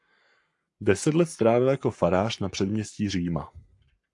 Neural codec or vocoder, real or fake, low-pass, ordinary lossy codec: codec, 44.1 kHz, 7.8 kbps, Pupu-Codec; fake; 10.8 kHz; AAC, 64 kbps